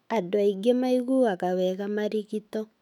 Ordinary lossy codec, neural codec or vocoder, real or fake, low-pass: none; autoencoder, 48 kHz, 128 numbers a frame, DAC-VAE, trained on Japanese speech; fake; 19.8 kHz